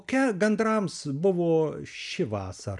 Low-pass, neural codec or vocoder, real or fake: 10.8 kHz; none; real